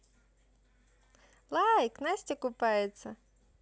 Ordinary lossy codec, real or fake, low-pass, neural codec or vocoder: none; real; none; none